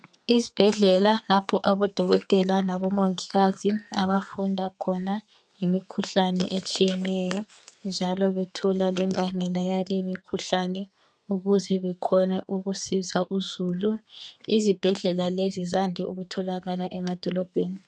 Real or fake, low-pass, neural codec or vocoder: fake; 9.9 kHz; codec, 32 kHz, 1.9 kbps, SNAC